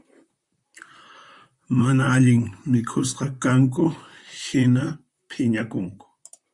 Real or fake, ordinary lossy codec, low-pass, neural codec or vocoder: fake; Opus, 64 kbps; 10.8 kHz; vocoder, 44.1 kHz, 128 mel bands, Pupu-Vocoder